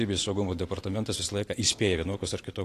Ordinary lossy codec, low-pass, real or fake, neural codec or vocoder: AAC, 48 kbps; 14.4 kHz; real; none